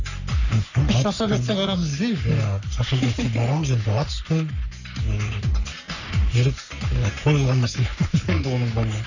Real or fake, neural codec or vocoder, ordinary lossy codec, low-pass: fake; codec, 44.1 kHz, 3.4 kbps, Pupu-Codec; none; 7.2 kHz